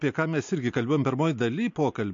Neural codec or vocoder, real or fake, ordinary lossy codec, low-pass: none; real; MP3, 48 kbps; 7.2 kHz